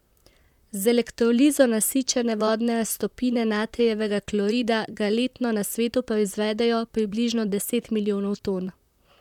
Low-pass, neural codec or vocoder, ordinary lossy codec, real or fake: 19.8 kHz; vocoder, 44.1 kHz, 128 mel bands, Pupu-Vocoder; none; fake